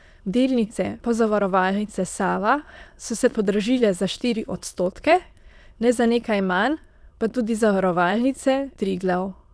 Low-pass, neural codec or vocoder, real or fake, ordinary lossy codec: none; autoencoder, 22.05 kHz, a latent of 192 numbers a frame, VITS, trained on many speakers; fake; none